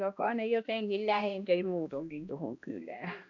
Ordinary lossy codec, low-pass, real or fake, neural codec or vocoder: none; 7.2 kHz; fake; codec, 16 kHz, 1 kbps, X-Codec, HuBERT features, trained on balanced general audio